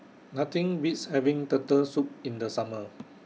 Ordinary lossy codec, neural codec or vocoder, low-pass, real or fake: none; none; none; real